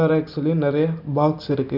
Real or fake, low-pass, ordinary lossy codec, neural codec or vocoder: real; 5.4 kHz; none; none